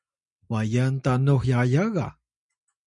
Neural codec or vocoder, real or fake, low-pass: none; real; 10.8 kHz